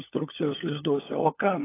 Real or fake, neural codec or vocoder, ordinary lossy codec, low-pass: fake; codec, 16 kHz, 16 kbps, FunCodec, trained on LibriTTS, 50 frames a second; AAC, 16 kbps; 3.6 kHz